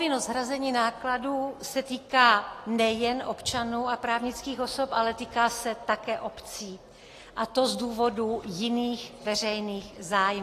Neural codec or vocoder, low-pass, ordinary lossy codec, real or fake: none; 14.4 kHz; AAC, 48 kbps; real